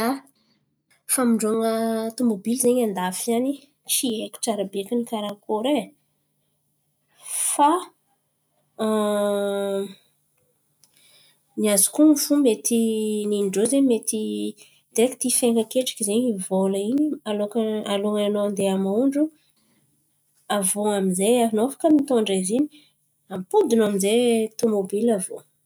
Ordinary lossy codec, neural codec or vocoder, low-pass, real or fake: none; none; none; real